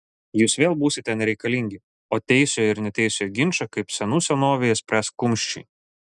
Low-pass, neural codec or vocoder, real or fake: 10.8 kHz; none; real